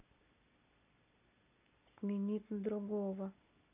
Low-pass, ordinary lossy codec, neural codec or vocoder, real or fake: 3.6 kHz; none; none; real